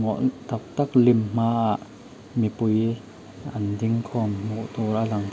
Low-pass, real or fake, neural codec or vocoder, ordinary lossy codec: none; real; none; none